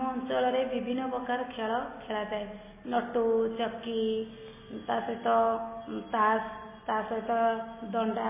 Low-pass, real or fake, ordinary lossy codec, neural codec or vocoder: 3.6 kHz; real; MP3, 16 kbps; none